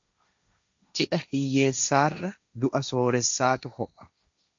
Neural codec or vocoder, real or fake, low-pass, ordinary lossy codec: codec, 16 kHz, 1.1 kbps, Voila-Tokenizer; fake; 7.2 kHz; MP3, 64 kbps